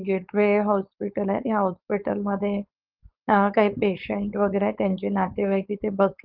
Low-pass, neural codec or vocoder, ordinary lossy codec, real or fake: 5.4 kHz; codec, 16 kHz, 4.8 kbps, FACodec; Opus, 24 kbps; fake